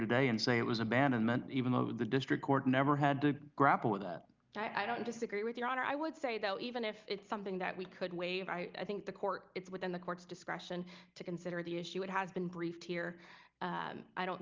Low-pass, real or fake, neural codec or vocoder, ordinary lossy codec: 7.2 kHz; real; none; Opus, 32 kbps